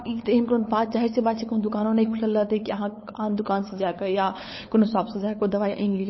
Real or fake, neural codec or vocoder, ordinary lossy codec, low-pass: fake; codec, 16 kHz, 8 kbps, FunCodec, trained on LibriTTS, 25 frames a second; MP3, 24 kbps; 7.2 kHz